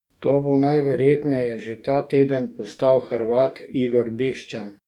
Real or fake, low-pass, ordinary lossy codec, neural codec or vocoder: fake; 19.8 kHz; none; codec, 44.1 kHz, 2.6 kbps, DAC